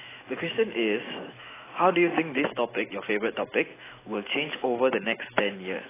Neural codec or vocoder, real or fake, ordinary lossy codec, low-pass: autoencoder, 48 kHz, 128 numbers a frame, DAC-VAE, trained on Japanese speech; fake; AAC, 16 kbps; 3.6 kHz